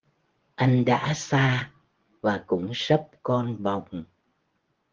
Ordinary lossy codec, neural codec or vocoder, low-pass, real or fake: Opus, 16 kbps; vocoder, 24 kHz, 100 mel bands, Vocos; 7.2 kHz; fake